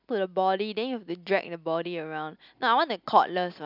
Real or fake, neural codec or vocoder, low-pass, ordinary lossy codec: real; none; 5.4 kHz; none